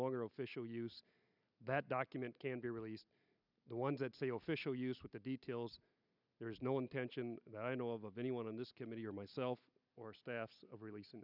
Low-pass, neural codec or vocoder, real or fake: 5.4 kHz; none; real